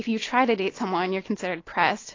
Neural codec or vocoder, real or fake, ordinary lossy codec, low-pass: none; real; AAC, 32 kbps; 7.2 kHz